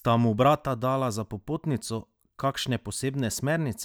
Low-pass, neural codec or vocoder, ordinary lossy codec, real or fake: none; none; none; real